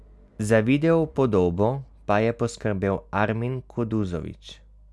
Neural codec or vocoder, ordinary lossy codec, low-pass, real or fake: none; none; none; real